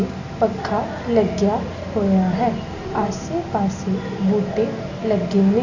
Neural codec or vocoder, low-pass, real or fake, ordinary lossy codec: none; 7.2 kHz; real; none